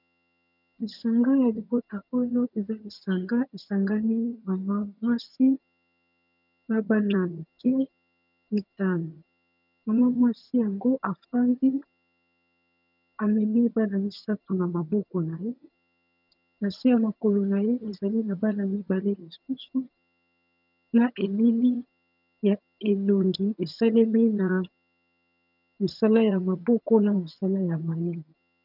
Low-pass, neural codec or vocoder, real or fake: 5.4 kHz; vocoder, 22.05 kHz, 80 mel bands, HiFi-GAN; fake